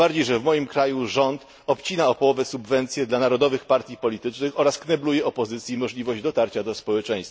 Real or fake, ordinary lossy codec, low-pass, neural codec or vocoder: real; none; none; none